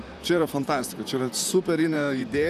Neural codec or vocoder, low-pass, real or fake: vocoder, 44.1 kHz, 128 mel bands, Pupu-Vocoder; 14.4 kHz; fake